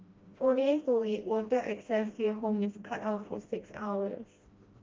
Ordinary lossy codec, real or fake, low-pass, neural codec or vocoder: Opus, 32 kbps; fake; 7.2 kHz; codec, 16 kHz, 1 kbps, FreqCodec, smaller model